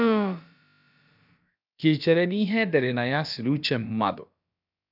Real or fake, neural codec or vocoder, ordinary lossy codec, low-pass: fake; codec, 16 kHz, about 1 kbps, DyCAST, with the encoder's durations; none; 5.4 kHz